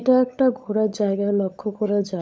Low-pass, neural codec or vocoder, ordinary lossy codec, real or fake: none; codec, 16 kHz, 16 kbps, FunCodec, trained on LibriTTS, 50 frames a second; none; fake